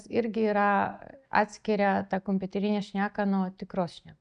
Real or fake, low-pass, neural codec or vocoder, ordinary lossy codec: real; 9.9 kHz; none; MP3, 96 kbps